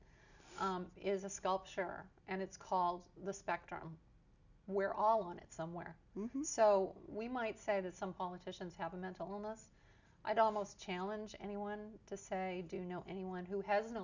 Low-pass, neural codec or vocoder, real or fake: 7.2 kHz; none; real